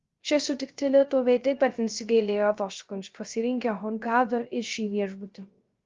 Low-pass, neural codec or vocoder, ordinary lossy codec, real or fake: 7.2 kHz; codec, 16 kHz, 0.3 kbps, FocalCodec; Opus, 32 kbps; fake